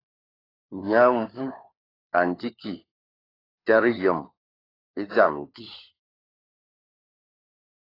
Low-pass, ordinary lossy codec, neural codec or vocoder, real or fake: 5.4 kHz; AAC, 24 kbps; codec, 16 kHz, 4 kbps, FunCodec, trained on LibriTTS, 50 frames a second; fake